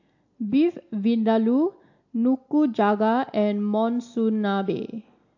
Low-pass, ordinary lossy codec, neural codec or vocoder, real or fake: 7.2 kHz; AAC, 48 kbps; none; real